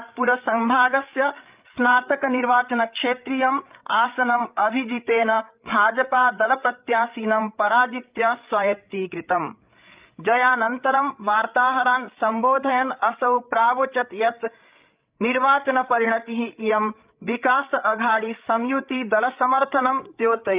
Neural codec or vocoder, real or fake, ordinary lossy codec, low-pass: codec, 16 kHz, 8 kbps, FreqCodec, larger model; fake; Opus, 32 kbps; 3.6 kHz